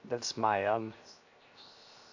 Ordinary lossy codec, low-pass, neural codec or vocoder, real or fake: AAC, 48 kbps; 7.2 kHz; codec, 16 kHz, 0.7 kbps, FocalCodec; fake